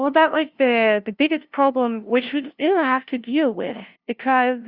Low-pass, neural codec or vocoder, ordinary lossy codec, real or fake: 5.4 kHz; codec, 16 kHz, 0.5 kbps, FunCodec, trained on LibriTTS, 25 frames a second; Opus, 64 kbps; fake